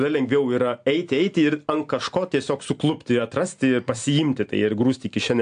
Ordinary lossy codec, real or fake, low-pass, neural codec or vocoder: AAC, 64 kbps; real; 9.9 kHz; none